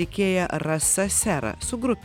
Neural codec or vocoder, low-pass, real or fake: none; 19.8 kHz; real